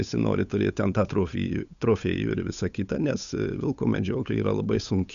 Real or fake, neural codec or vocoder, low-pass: fake; codec, 16 kHz, 8 kbps, FunCodec, trained on Chinese and English, 25 frames a second; 7.2 kHz